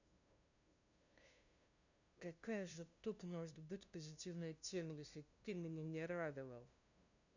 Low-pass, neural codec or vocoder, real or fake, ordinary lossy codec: 7.2 kHz; codec, 16 kHz, 0.5 kbps, FunCodec, trained on LibriTTS, 25 frames a second; fake; none